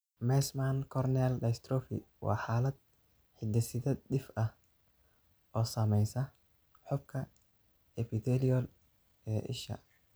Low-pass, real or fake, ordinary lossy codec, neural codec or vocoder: none; real; none; none